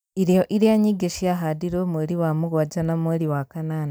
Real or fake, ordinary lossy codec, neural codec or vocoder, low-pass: real; none; none; none